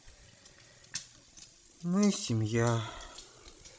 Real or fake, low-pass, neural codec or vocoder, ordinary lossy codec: fake; none; codec, 16 kHz, 16 kbps, FreqCodec, larger model; none